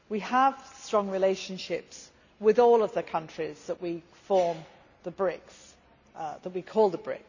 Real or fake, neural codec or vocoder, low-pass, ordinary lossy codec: real; none; 7.2 kHz; none